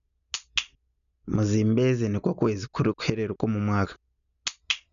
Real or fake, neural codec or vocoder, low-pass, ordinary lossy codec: real; none; 7.2 kHz; none